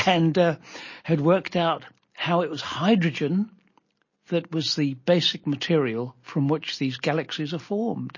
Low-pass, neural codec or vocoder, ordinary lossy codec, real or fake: 7.2 kHz; none; MP3, 32 kbps; real